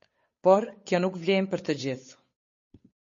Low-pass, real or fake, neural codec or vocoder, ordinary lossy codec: 7.2 kHz; fake; codec, 16 kHz, 8 kbps, FunCodec, trained on Chinese and English, 25 frames a second; MP3, 32 kbps